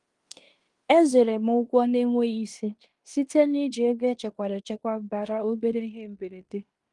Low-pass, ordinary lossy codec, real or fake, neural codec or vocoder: 10.8 kHz; Opus, 24 kbps; fake; codec, 16 kHz in and 24 kHz out, 0.9 kbps, LongCat-Audio-Codec, fine tuned four codebook decoder